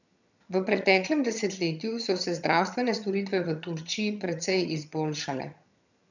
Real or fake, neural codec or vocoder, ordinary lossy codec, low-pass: fake; vocoder, 22.05 kHz, 80 mel bands, HiFi-GAN; none; 7.2 kHz